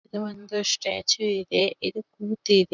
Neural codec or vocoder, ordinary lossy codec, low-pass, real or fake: vocoder, 44.1 kHz, 128 mel bands, Pupu-Vocoder; none; 7.2 kHz; fake